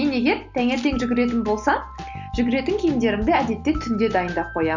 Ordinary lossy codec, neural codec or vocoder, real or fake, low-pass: Opus, 64 kbps; none; real; 7.2 kHz